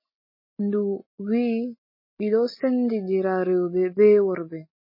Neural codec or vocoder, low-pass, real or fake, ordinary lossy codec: none; 5.4 kHz; real; MP3, 24 kbps